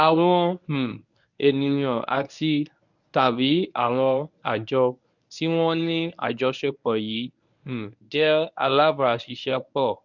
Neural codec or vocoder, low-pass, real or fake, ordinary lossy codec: codec, 24 kHz, 0.9 kbps, WavTokenizer, medium speech release version 1; 7.2 kHz; fake; none